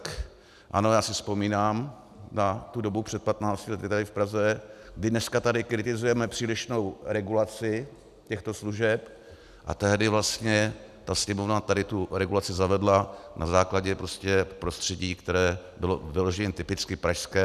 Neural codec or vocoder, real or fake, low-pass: none; real; 14.4 kHz